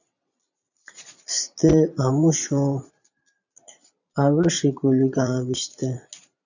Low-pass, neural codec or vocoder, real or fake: 7.2 kHz; vocoder, 22.05 kHz, 80 mel bands, Vocos; fake